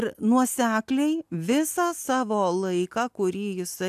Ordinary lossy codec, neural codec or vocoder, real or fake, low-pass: AAC, 96 kbps; none; real; 14.4 kHz